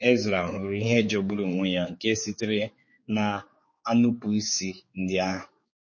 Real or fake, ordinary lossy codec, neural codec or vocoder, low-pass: fake; MP3, 32 kbps; codec, 16 kHz, 4 kbps, X-Codec, HuBERT features, trained on general audio; 7.2 kHz